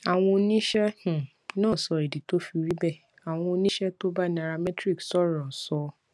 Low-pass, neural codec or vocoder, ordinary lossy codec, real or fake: none; none; none; real